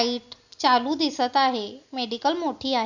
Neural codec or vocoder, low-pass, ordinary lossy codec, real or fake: none; 7.2 kHz; none; real